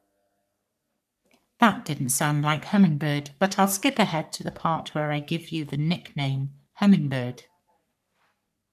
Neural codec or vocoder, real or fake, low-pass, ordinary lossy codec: codec, 44.1 kHz, 3.4 kbps, Pupu-Codec; fake; 14.4 kHz; none